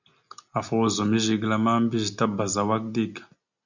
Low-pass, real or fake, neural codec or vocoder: 7.2 kHz; real; none